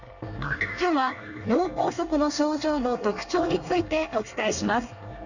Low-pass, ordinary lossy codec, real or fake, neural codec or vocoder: 7.2 kHz; none; fake; codec, 24 kHz, 1 kbps, SNAC